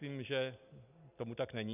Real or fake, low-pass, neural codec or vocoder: real; 3.6 kHz; none